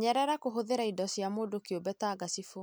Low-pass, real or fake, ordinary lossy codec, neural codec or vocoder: none; real; none; none